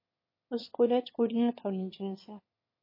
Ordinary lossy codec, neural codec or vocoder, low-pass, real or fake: MP3, 24 kbps; autoencoder, 22.05 kHz, a latent of 192 numbers a frame, VITS, trained on one speaker; 5.4 kHz; fake